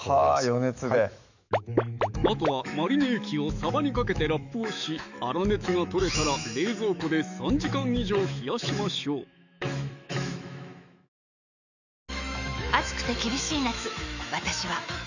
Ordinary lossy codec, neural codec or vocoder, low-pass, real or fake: none; autoencoder, 48 kHz, 128 numbers a frame, DAC-VAE, trained on Japanese speech; 7.2 kHz; fake